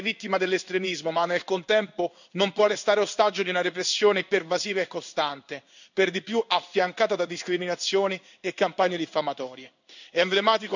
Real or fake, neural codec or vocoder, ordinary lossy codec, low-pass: fake; codec, 16 kHz in and 24 kHz out, 1 kbps, XY-Tokenizer; none; 7.2 kHz